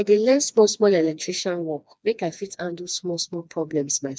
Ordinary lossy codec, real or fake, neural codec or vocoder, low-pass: none; fake; codec, 16 kHz, 2 kbps, FreqCodec, smaller model; none